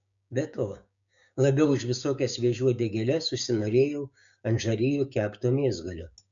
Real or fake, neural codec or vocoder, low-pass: fake; codec, 16 kHz, 6 kbps, DAC; 7.2 kHz